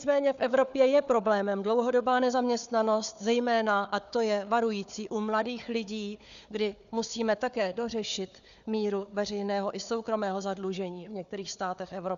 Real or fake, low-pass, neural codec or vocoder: fake; 7.2 kHz; codec, 16 kHz, 4 kbps, FunCodec, trained on Chinese and English, 50 frames a second